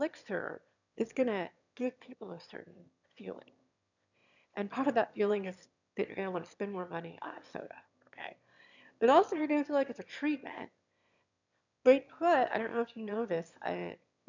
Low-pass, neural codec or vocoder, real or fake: 7.2 kHz; autoencoder, 22.05 kHz, a latent of 192 numbers a frame, VITS, trained on one speaker; fake